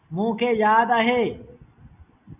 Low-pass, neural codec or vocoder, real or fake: 3.6 kHz; none; real